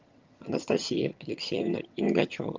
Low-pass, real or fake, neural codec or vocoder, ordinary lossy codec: 7.2 kHz; fake; vocoder, 22.05 kHz, 80 mel bands, HiFi-GAN; Opus, 32 kbps